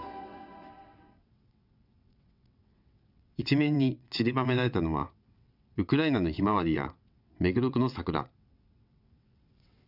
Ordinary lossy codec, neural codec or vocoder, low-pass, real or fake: none; vocoder, 22.05 kHz, 80 mel bands, WaveNeXt; 5.4 kHz; fake